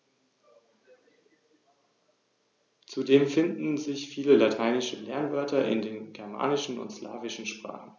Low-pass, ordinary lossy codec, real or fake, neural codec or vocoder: 7.2 kHz; none; real; none